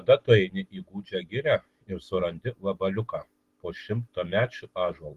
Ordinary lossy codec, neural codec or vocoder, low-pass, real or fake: Opus, 16 kbps; none; 14.4 kHz; real